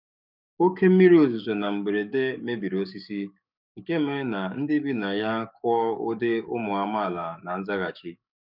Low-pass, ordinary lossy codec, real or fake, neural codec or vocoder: 5.4 kHz; none; fake; codec, 44.1 kHz, 7.8 kbps, DAC